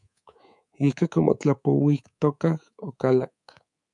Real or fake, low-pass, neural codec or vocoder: fake; 10.8 kHz; codec, 24 kHz, 3.1 kbps, DualCodec